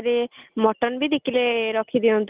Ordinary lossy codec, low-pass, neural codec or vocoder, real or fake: Opus, 24 kbps; 3.6 kHz; none; real